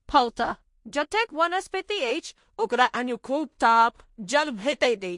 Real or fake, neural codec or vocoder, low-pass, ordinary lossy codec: fake; codec, 16 kHz in and 24 kHz out, 0.4 kbps, LongCat-Audio-Codec, two codebook decoder; 10.8 kHz; MP3, 48 kbps